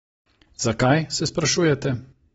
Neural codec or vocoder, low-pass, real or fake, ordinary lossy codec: none; 19.8 kHz; real; AAC, 24 kbps